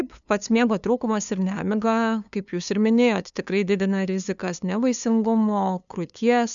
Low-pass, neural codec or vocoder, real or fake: 7.2 kHz; codec, 16 kHz, 2 kbps, FunCodec, trained on LibriTTS, 25 frames a second; fake